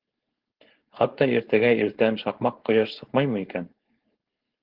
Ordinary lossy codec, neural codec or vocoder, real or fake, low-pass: Opus, 16 kbps; codec, 16 kHz, 4.8 kbps, FACodec; fake; 5.4 kHz